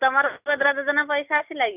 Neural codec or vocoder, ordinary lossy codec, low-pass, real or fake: none; none; 3.6 kHz; real